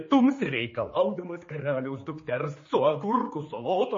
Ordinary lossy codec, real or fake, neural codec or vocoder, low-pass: MP3, 32 kbps; fake; codec, 16 kHz, 4 kbps, X-Codec, HuBERT features, trained on general audio; 7.2 kHz